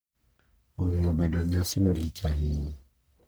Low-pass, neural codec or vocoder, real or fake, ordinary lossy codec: none; codec, 44.1 kHz, 1.7 kbps, Pupu-Codec; fake; none